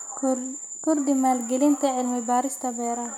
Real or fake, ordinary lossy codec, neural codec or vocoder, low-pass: real; none; none; 19.8 kHz